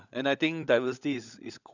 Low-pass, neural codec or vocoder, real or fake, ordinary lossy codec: 7.2 kHz; codec, 16 kHz, 16 kbps, FunCodec, trained on LibriTTS, 50 frames a second; fake; none